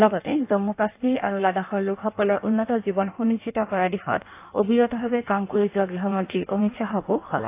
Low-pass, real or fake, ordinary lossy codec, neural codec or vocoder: 3.6 kHz; fake; AAC, 24 kbps; codec, 16 kHz in and 24 kHz out, 1.1 kbps, FireRedTTS-2 codec